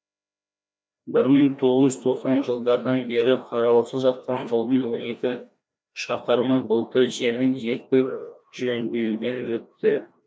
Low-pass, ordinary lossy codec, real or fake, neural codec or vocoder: none; none; fake; codec, 16 kHz, 1 kbps, FreqCodec, larger model